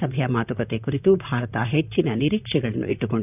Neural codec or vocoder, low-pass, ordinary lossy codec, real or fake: vocoder, 44.1 kHz, 128 mel bands, Pupu-Vocoder; 3.6 kHz; none; fake